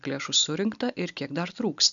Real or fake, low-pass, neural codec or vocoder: real; 7.2 kHz; none